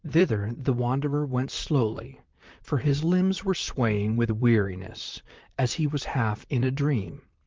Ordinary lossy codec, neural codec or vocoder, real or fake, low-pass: Opus, 32 kbps; vocoder, 44.1 kHz, 128 mel bands, Pupu-Vocoder; fake; 7.2 kHz